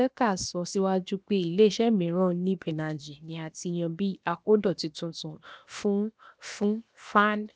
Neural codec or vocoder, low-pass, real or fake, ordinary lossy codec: codec, 16 kHz, 0.7 kbps, FocalCodec; none; fake; none